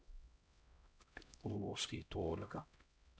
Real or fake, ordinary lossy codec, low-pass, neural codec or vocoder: fake; none; none; codec, 16 kHz, 0.5 kbps, X-Codec, HuBERT features, trained on LibriSpeech